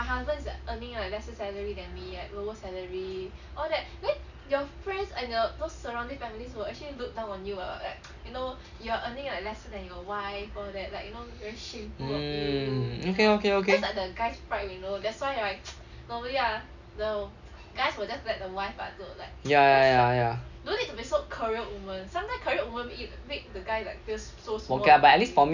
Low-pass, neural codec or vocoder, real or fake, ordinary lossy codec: 7.2 kHz; none; real; none